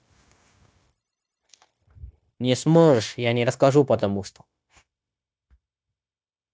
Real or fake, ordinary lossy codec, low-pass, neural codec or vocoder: fake; none; none; codec, 16 kHz, 0.9 kbps, LongCat-Audio-Codec